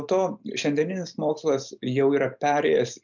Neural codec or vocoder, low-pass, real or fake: none; 7.2 kHz; real